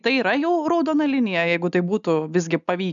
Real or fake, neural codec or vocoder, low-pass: real; none; 7.2 kHz